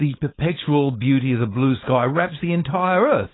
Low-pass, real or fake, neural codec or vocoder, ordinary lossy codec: 7.2 kHz; fake; codec, 16 kHz, 4.8 kbps, FACodec; AAC, 16 kbps